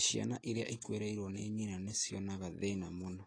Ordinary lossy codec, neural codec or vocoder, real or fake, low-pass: AAC, 32 kbps; none; real; 9.9 kHz